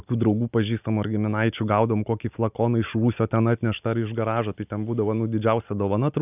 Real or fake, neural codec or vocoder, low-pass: real; none; 3.6 kHz